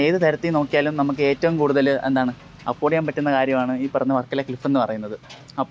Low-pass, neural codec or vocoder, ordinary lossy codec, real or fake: none; none; none; real